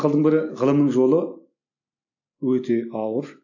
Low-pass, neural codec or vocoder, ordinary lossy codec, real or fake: 7.2 kHz; none; AAC, 48 kbps; real